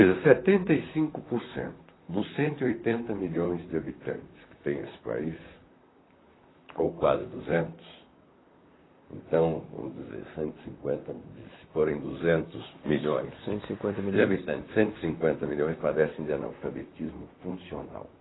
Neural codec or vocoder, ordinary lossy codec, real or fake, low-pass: vocoder, 44.1 kHz, 128 mel bands, Pupu-Vocoder; AAC, 16 kbps; fake; 7.2 kHz